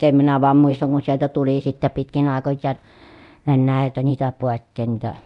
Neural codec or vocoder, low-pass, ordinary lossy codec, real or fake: codec, 24 kHz, 0.9 kbps, DualCodec; 10.8 kHz; none; fake